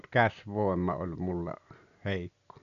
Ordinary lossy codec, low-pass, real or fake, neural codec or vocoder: none; 7.2 kHz; real; none